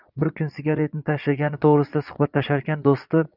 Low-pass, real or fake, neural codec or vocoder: 5.4 kHz; fake; vocoder, 22.05 kHz, 80 mel bands, Vocos